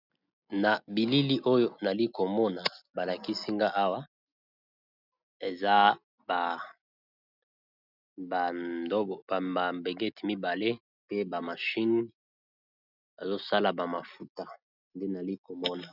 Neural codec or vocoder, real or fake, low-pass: none; real; 5.4 kHz